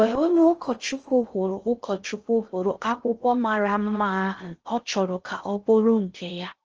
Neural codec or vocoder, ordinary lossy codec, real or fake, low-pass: codec, 16 kHz in and 24 kHz out, 0.6 kbps, FocalCodec, streaming, 4096 codes; Opus, 24 kbps; fake; 7.2 kHz